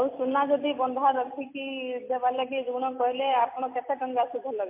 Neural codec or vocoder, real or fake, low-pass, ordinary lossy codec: none; real; 3.6 kHz; MP3, 24 kbps